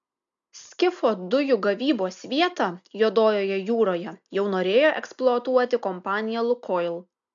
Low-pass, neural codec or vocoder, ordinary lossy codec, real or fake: 7.2 kHz; none; MP3, 64 kbps; real